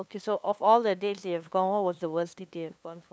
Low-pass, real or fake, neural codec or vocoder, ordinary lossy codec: none; fake; codec, 16 kHz, 2 kbps, FunCodec, trained on LibriTTS, 25 frames a second; none